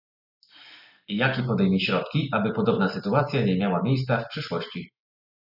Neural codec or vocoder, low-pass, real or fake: none; 5.4 kHz; real